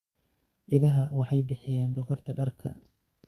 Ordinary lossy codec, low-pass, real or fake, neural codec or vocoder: Opus, 64 kbps; 14.4 kHz; fake; codec, 32 kHz, 1.9 kbps, SNAC